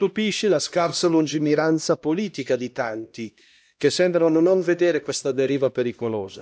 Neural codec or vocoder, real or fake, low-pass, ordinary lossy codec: codec, 16 kHz, 1 kbps, X-Codec, HuBERT features, trained on LibriSpeech; fake; none; none